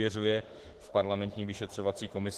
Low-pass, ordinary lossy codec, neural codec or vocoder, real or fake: 14.4 kHz; Opus, 16 kbps; autoencoder, 48 kHz, 32 numbers a frame, DAC-VAE, trained on Japanese speech; fake